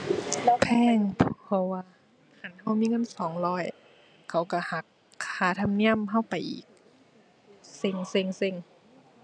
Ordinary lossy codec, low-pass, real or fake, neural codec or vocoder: none; 9.9 kHz; real; none